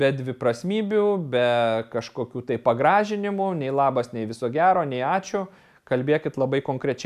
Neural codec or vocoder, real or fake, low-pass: none; real; 14.4 kHz